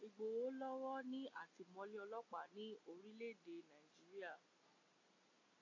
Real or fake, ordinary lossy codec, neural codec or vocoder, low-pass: real; AAC, 32 kbps; none; 7.2 kHz